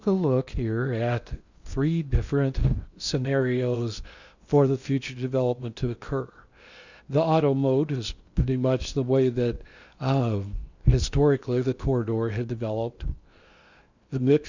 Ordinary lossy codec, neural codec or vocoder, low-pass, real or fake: Opus, 64 kbps; codec, 16 kHz in and 24 kHz out, 0.8 kbps, FocalCodec, streaming, 65536 codes; 7.2 kHz; fake